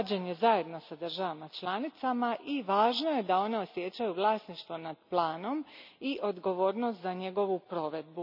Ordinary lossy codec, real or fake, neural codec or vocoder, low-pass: none; real; none; 5.4 kHz